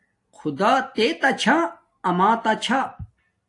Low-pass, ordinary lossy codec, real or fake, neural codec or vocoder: 10.8 kHz; AAC, 48 kbps; real; none